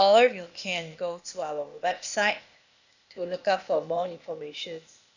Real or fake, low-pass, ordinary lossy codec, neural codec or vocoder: fake; 7.2 kHz; none; codec, 16 kHz, 0.8 kbps, ZipCodec